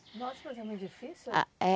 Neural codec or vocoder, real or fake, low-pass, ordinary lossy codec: none; real; none; none